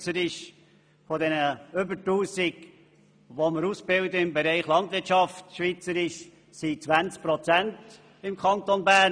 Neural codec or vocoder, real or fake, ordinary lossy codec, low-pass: none; real; none; 9.9 kHz